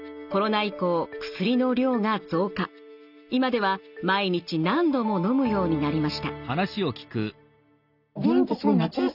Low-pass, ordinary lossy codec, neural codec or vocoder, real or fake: 5.4 kHz; none; none; real